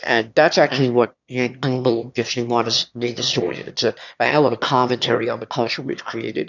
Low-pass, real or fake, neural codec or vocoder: 7.2 kHz; fake; autoencoder, 22.05 kHz, a latent of 192 numbers a frame, VITS, trained on one speaker